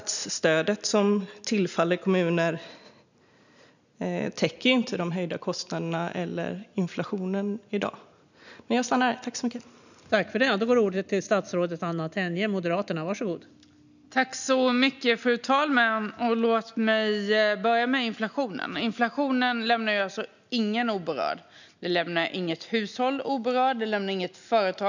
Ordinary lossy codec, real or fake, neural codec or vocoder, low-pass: none; real; none; 7.2 kHz